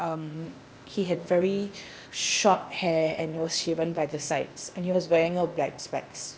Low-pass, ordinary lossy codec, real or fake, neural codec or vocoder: none; none; fake; codec, 16 kHz, 0.8 kbps, ZipCodec